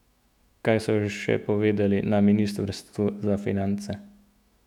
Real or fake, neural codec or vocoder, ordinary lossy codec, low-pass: fake; autoencoder, 48 kHz, 128 numbers a frame, DAC-VAE, trained on Japanese speech; none; 19.8 kHz